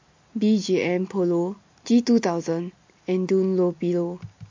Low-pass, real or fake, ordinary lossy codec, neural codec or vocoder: 7.2 kHz; real; MP3, 48 kbps; none